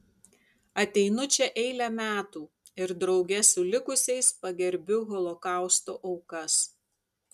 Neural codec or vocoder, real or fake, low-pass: none; real; 14.4 kHz